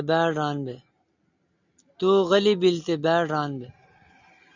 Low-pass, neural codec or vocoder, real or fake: 7.2 kHz; none; real